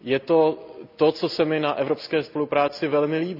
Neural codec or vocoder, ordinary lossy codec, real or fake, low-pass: none; none; real; 5.4 kHz